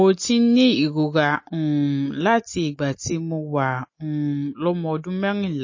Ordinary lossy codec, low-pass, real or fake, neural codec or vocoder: MP3, 32 kbps; 7.2 kHz; real; none